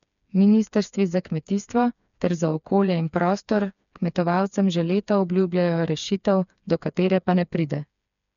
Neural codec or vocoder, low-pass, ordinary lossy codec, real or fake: codec, 16 kHz, 4 kbps, FreqCodec, smaller model; 7.2 kHz; none; fake